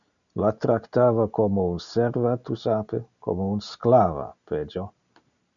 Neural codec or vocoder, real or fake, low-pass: none; real; 7.2 kHz